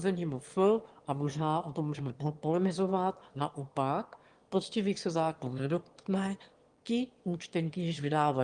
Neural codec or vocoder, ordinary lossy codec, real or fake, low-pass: autoencoder, 22.05 kHz, a latent of 192 numbers a frame, VITS, trained on one speaker; Opus, 24 kbps; fake; 9.9 kHz